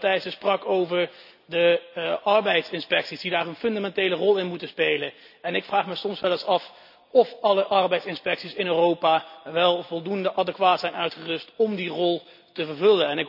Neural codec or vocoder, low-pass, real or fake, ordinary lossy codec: none; 5.4 kHz; real; none